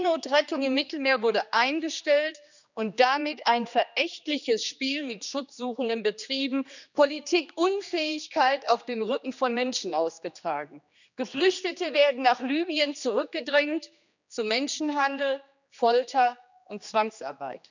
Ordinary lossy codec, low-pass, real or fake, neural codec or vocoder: none; 7.2 kHz; fake; codec, 16 kHz, 2 kbps, X-Codec, HuBERT features, trained on general audio